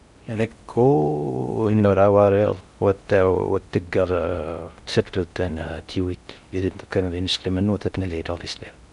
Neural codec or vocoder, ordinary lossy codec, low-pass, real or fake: codec, 16 kHz in and 24 kHz out, 0.6 kbps, FocalCodec, streaming, 4096 codes; none; 10.8 kHz; fake